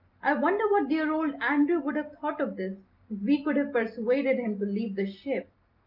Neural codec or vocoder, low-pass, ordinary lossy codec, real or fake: none; 5.4 kHz; Opus, 24 kbps; real